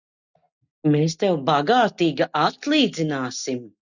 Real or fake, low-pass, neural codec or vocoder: real; 7.2 kHz; none